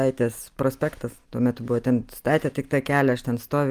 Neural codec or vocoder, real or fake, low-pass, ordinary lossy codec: none; real; 14.4 kHz; Opus, 32 kbps